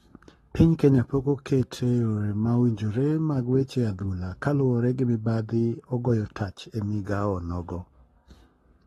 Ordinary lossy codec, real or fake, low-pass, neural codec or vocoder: AAC, 32 kbps; fake; 19.8 kHz; codec, 44.1 kHz, 7.8 kbps, Pupu-Codec